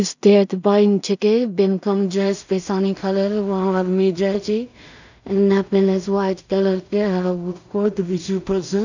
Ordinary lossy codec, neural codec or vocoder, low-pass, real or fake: none; codec, 16 kHz in and 24 kHz out, 0.4 kbps, LongCat-Audio-Codec, two codebook decoder; 7.2 kHz; fake